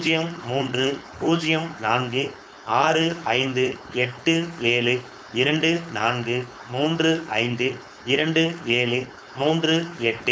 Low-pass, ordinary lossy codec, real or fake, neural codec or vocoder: none; none; fake; codec, 16 kHz, 4.8 kbps, FACodec